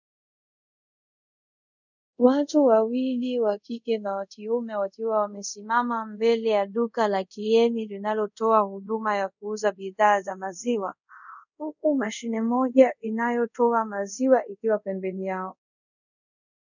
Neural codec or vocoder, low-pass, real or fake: codec, 24 kHz, 0.5 kbps, DualCodec; 7.2 kHz; fake